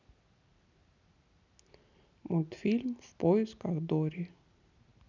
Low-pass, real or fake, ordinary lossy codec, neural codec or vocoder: 7.2 kHz; real; none; none